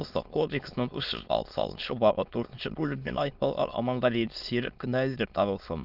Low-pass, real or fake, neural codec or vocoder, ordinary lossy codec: 5.4 kHz; fake; autoencoder, 22.05 kHz, a latent of 192 numbers a frame, VITS, trained on many speakers; Opus, 32 kbps